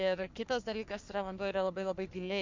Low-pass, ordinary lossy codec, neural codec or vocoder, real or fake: 7.2 kHz; MP3, 64 kbps; codec, 44.1 kHz, 3.4 kbps, Pupu-Codec; fake